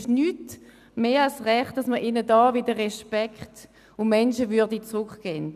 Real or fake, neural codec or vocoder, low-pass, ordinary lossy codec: real; none; 14.4 kHz; none